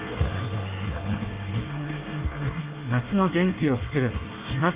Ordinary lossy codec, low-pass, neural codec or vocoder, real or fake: Opus, 24 kbps; 3.6 kHz; codec, 24 kHz, 1 kbps, SNAC; fake